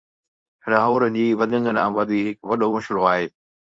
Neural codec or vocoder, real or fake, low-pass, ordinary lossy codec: codec, 24 kHz, 0.9 kbps, WavTokenizer, medium speech release version 2; fake; 9.9 kHz; AAC, 64 kbps